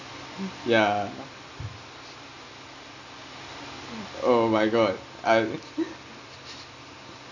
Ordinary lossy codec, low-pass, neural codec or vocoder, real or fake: none; 7.2 kHz; none; real